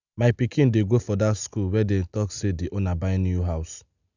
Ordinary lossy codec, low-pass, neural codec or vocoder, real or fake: none; 7.2 kHz; none; real